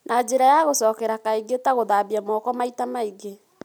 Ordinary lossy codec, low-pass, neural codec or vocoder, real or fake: none; none; none; real